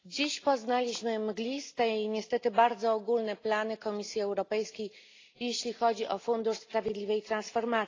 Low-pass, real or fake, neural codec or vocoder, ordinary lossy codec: 7.2 kHz; real; none; AAC, 32 kbps